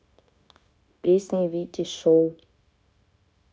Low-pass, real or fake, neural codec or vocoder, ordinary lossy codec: none; fake; codec, 16 kHz, 0.9 kbps, LongCat-Audio-Codec; none